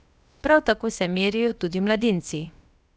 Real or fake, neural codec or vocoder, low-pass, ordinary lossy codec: fake; codec, 16 kHz, about 1 kbps, DyCAST, with the encoder's durations; none; none